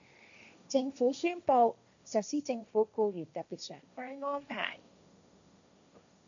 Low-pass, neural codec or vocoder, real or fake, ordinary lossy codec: 7.2 kHz; codec, 16 kHz, 1.1 kbps, Voila-Tokenizer; fake; MP3, 96 kbps